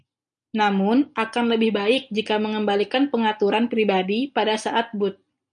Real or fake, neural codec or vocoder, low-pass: real; none; 9.9 kHz